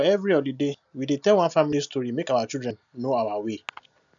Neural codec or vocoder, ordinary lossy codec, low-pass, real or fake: none; none; 7.2 kHz; real